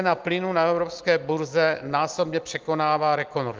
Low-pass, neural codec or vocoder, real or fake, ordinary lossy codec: 7.2 kHz; none; real; Opus, 24 kbps